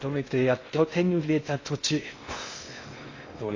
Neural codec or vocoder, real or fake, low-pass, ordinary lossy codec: codec, 16 kHz in and 24 kHz out, 0.6 kbps, FocalCodec, streaming, 2048 codes; fake; 7.2 kHz; AAC, 32 kbps